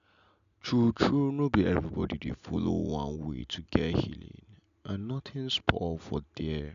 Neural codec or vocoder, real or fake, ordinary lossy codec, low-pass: none; real; MP3, 96 kbps; 7.2 kHz